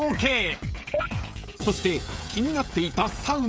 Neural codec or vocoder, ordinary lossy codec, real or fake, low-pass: codec, 16 kHz, 16 kbps, FreqCodec, smaller model; none; fake; none